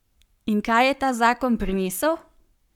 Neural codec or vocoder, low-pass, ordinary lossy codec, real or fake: codec, 44.1 kHz, 7.8 kbps, Pupu-Codec; 19.8 kHz; none; fake